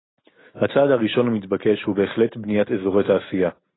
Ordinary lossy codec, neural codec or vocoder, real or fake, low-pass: AAC, 16 kbps; none; real; 7.2 kHz